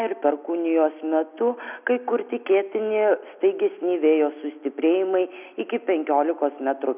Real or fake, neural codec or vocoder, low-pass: real; none; 3.6 kHz